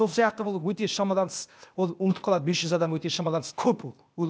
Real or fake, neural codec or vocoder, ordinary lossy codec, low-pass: fake; codec, 16 kHz, 0.8 kbps, ZipCodec; none; none